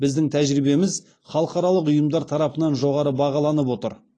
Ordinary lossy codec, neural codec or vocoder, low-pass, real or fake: AAC, 32 kbps; none; 9.9 kHz; real